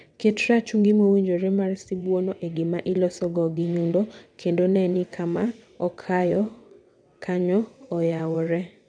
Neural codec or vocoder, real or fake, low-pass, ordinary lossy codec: vocoder, 22.05 kHz, 80 mel bands, WaveNeXt; fake; 9.9 kHz; none